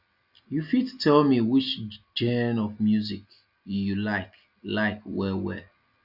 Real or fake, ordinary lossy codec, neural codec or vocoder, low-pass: real; AAC, 48 kbps; none; 5.4 kHz